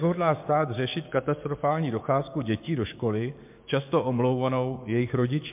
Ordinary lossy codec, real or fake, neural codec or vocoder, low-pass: MP3, 32 kbps; fake; codec, 16 kHz, 6 kbps, DAC; 3.6 kHz